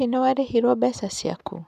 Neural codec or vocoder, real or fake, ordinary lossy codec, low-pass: none; real; none; 14.4 kHz